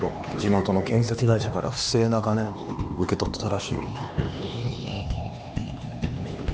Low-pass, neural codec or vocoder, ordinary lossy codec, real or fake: none; codec, 16 kHz, 2 kbps, X-Codec, HuBERT features, trained on LibriSpeech; none; fake